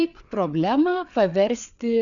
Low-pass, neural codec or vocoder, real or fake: 7.2 kHz; codec, 16 kHz, 4 kbps, FreqCodec, larger model; fake